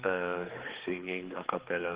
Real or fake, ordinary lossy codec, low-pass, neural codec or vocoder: fake; Opus, 16 kbps; 3.6 kHz; codec, 16 kHz, 4 kbps, X-Codec, HuBERT features, trained on general audio